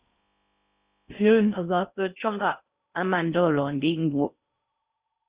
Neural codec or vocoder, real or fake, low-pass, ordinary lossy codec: codec, 16 kHz in and 24 kHz out, 0.6 kbps, FocalCodec, streaming, 4096 codes; fake; 3.6 kHz; Opus, 64 kbps